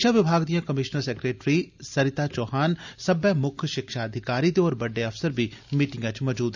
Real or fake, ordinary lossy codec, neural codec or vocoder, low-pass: real; none; none; 7.2 kHz